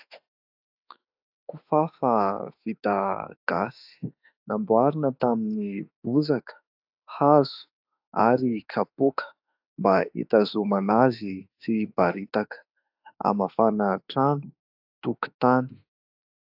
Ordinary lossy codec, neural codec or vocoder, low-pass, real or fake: AAC, 48 kbps; autoencoder, 48 kHz, 32 numbers a frame, DAC-VAE, trained on Japanese speech; 5.4 kHz; fake